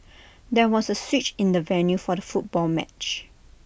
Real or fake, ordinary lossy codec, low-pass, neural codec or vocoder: real; none; none; none